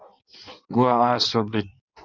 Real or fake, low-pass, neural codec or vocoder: fake; 7.2 kHz; codec, 16 kHz in and 24 kHz out, 1.1 kbps, FireRedTTS-2 codec